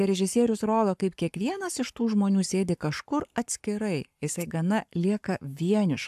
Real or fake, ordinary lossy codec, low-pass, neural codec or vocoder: fake; AAC, 96 kbps; 14.4 kHz; codec, 44.1 kHz, 7.8 kbps, Pupu-Codec